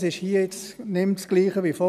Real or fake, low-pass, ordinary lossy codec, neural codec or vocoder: real; 14.4 kHz; none; none